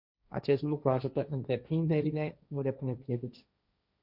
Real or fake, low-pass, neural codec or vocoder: fake; 5.4 kHz; codec, 16 kHz, 1.1 kbps, Voila-Tokenizer